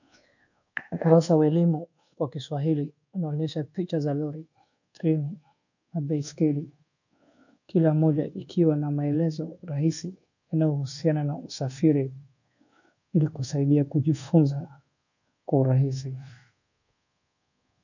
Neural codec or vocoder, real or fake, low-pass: codec, 24 kHz, 1.2 kbps, DualCodec; fake; 7.2 kHz